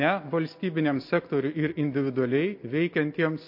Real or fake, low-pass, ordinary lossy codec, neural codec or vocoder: real; 5.4 kHz; MP3, 32 kbps; none